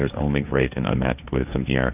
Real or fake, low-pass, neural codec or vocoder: fake; 3.6 kHz; codec, 16 kHz, 1.1 kbps, Voila-Tokenizer